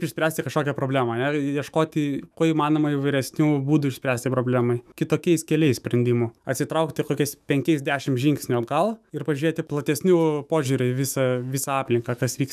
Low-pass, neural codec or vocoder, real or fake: 14.4 kHz; autoencoder, 48 kHz, 128 numbers a frame, DAC-VAE, trained on Japanese speech; fake